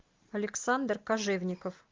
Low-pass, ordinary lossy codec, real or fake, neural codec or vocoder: 7.2 kHz; Opus, 32 kbps; real; none